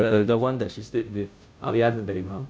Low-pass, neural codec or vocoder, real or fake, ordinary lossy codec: none; codec, 16 kHz, 0.5 kbps, FunCodec, trained on Chinese and English, 25 frames a second; fake; none